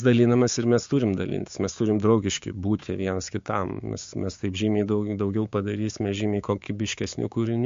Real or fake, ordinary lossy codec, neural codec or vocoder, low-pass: fake; MP3, 48 kbps; codec, 16 kHz, 6 kbps, DAC; 7.2 kHz